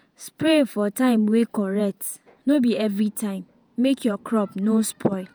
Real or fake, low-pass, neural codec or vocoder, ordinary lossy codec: fake; none; vocoder, 48 kHz, 128 mel bands, Vocos; none